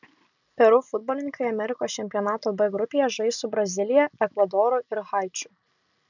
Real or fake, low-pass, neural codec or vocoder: real; 7.2 kHz; none